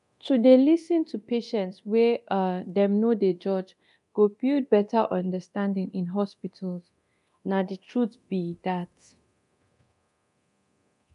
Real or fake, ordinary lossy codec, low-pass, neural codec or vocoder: fake; MP3, 96 kbps; 10.8 kHz; codec, 24 kHz, 0.9 kbps, DualCodec